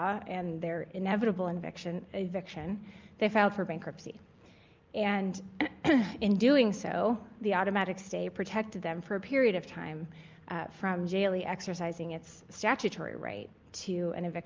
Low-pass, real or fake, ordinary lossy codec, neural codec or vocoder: 7.2 kHz; real; Opus, 24 kbps; none